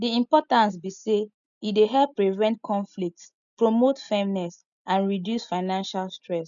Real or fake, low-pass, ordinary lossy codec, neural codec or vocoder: real; 7.2 kHz; none; none